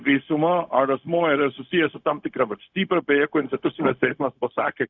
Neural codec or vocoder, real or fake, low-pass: codec, 16 kHz, 0.4 kbps, LongCat-Audio-Codec; fake; 7.2 kHz